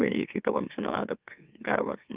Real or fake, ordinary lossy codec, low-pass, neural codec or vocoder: fake; Opus, 24 kbps; 3.6 kHz; autoencoder, 44.1 kHz, a latent of 192 numbers a frame, MeloTTS